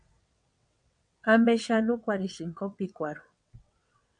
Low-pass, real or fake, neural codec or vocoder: 9.9 kHz; fake; vocoder, 22.05 kHz, 80 mel bands, WaveNeXt